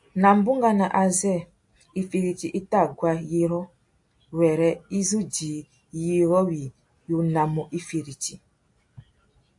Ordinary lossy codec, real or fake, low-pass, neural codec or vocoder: AAC, 64 kbps; real; 10.8 kHz; none